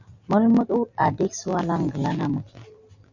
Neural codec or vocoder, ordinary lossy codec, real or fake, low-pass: none; Opus, 64 kbps; real; 7.2 kHz